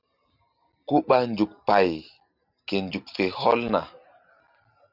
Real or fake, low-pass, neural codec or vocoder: real; 5.4 kHz; none